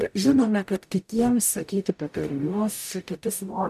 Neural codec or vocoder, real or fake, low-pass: codec, 44.1 kHz, 0.9 kbps, DAC; fake; 14.4 kHz